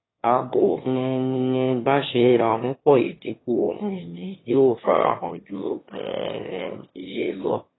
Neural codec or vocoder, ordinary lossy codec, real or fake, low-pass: autoencoder, 22.05 kHz, a latent of 192 numbers a frame, VITS, trained on one speaker; AAC, 16 kbps; fake; 7.2 kHz